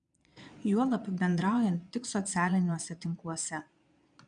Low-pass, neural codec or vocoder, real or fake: 9.9 kHz; vocoder, 22.05 kHz, 80 mel bands, Vocos; fake